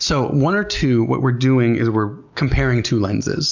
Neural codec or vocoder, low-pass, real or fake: none; 7.2 kHz; real